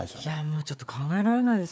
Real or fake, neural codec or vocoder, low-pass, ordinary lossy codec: fake; codec, 16 kHz, 4 kbps, FreqCodec, larger model; none; none